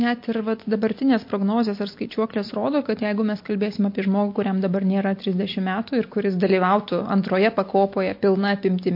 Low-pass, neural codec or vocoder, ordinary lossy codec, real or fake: 5.4 kHz; none; MP3, 32 kbps; real